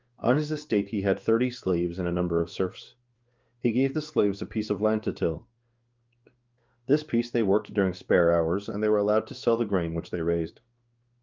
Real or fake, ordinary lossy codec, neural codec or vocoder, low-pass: fake; Opus, 32 kbps; codec, 16 kHz in and 24 kHz out, 1 kbps, XY-Tokenizer; 7.2 kHz